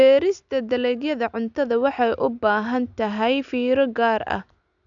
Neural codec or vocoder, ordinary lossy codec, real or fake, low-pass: none; none; real; 7.2 kHz